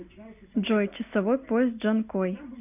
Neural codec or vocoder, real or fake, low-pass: none; real; 3.6 kHz